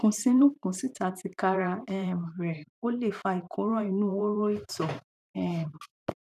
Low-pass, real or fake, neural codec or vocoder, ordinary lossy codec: 14.4 kHz; fake; vocoder, 44.1 kHz, 128 mel bands every 512 samples, BigVGAN v2; none